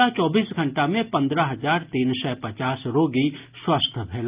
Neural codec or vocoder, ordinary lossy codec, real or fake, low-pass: none; Opus, 32 kbps; real; 3.6 kHz